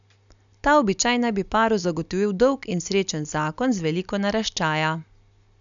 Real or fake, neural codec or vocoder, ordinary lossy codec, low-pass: real; none; none; 7.2 kHz